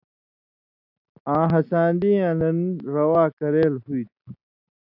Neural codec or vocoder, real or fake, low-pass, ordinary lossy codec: none; real; 5.4 kHz; AAC, 48 kbps